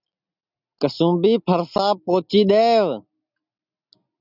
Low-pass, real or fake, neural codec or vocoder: 5.4 kHz; real; none